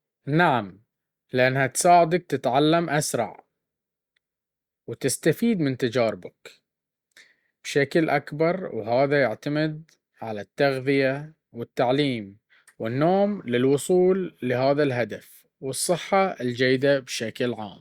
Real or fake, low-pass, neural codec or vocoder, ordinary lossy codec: real; 19.8 kHz; none; Opus, 64 kbps